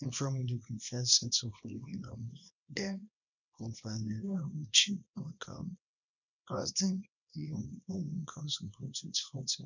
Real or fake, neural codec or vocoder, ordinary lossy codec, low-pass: fake; codec, 24 kHz, 0.9 kbps, WavTokenizer, small release; none; 7.2 kHz